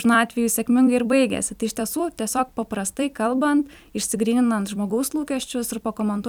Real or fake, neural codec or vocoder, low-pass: fake; vocoder, 44.1 kHz, 128 mel bands every 256 samples, BigVGAN v2; 19.8 kHz